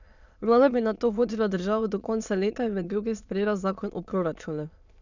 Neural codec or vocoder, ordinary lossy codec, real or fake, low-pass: autoencoder, 22.05 kHz, a latent of 192 numbers a frame, VITS, trained on many speakers; none; fake; 7.2 kHz